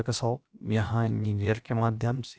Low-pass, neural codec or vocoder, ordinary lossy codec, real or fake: none; codec, 16 kHz, about 1 kbps, DyCAST, with the encoder's durations; none; fake